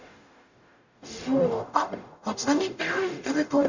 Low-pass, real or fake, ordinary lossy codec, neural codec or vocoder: 7.2 kHz; fake; none; codec, 44.1 kHz, 0.9 kbps, DAC